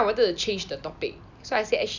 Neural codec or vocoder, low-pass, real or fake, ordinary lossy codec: none; 7.2 kHz; real; none